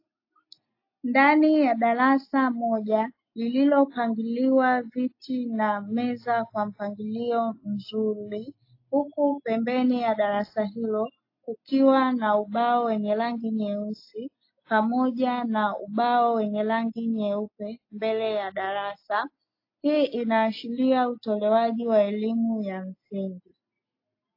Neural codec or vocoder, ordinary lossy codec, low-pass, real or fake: none; AAC, 32 kbps; 5.4 kHz; real